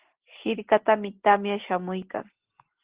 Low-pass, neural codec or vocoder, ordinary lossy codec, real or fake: 3.6 kHz; none; Opus, 16 kbps; real